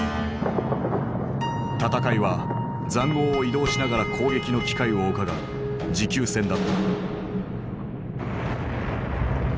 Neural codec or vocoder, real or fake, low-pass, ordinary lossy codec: none; real; none; none